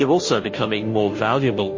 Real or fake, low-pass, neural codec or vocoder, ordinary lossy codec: fake; 7.2 kHz; codec, 16 kHz, 0.5 kbps, FunCodec, trained on Chinese and English, 25 frames a second; MP3, 32 kbps